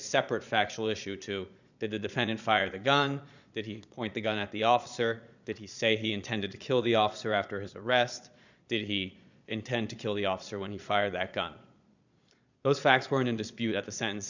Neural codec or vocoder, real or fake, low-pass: vocoder, 22.05 kHz, 80 mel bands, Vocos; fake; 7.2 kHz